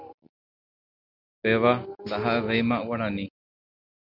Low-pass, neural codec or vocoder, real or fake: 5.4 kHz; none; real